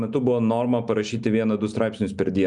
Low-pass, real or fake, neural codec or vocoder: 9.9 kHz; real; none